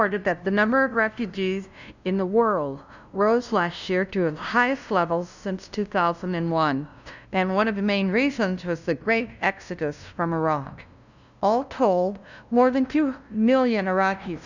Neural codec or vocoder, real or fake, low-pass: codec, 16 kHz, 0.5 kbps, FunCodec, trained on LibriTTS, 25 frames a second; fake; 7.2 kHz